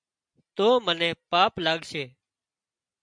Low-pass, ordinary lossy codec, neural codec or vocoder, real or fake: 9.9 kHz; MP3, 64 kbps; none; real